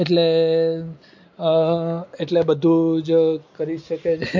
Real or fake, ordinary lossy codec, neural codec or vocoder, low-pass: real; MP3, 48 kbps; none; 7.2 kHz